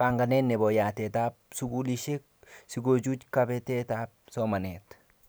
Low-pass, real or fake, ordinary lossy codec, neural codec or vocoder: none; real; none; none